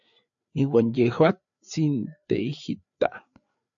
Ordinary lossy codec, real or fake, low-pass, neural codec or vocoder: AAC, 64 kbps; fake; 7.2 kHz; codec, 16 kHz, 4 kbps, FreqCodec, larger model